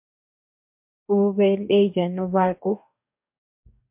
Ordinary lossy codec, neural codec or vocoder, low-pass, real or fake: MP3, 32 kbps; codec, 44.1 kHz, 2.6 kbps, DAC; 3.6 kHz; fake